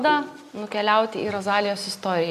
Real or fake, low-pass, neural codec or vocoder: real; 14.4 kHz; none